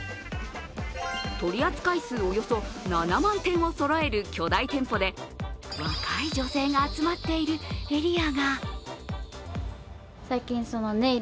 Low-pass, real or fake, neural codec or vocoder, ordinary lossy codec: none; real; none; none